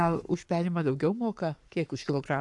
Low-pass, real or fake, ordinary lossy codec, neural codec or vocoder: 10.8 kHz; fake; AAC, 64 kbps; codec, 44.1 kHz, 7.8 kbps, Pupu-Codec